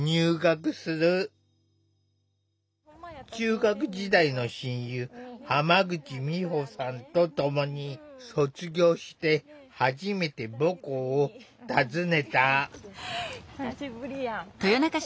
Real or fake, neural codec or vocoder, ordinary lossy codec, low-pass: real; none; none; none